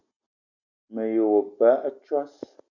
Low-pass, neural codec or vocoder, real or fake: 7.2 kHz; none; real